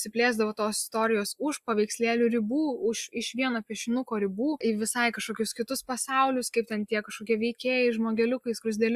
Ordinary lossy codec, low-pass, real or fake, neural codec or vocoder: Opus, 64 kbps; 14.4 kHz; real; none